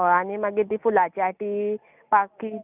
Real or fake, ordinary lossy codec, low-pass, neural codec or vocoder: real; none; 3.6 kHz; none